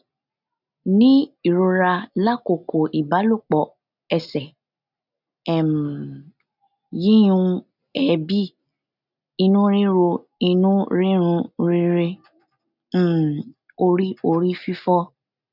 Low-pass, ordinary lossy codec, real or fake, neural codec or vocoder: 5.4 kHz; none; real; none